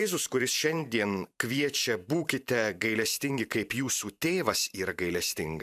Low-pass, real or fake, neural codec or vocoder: 14.4 kHz; fake; vocoder, 44.1 kHz, 128 mel bands every 512 samples, BigVGAN v2